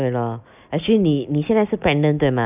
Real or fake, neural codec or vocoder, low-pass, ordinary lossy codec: real; none; 3.6 kHz; none